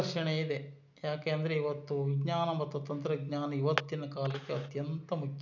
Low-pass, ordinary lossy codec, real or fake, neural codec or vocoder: 7.2 kHz; none; real; none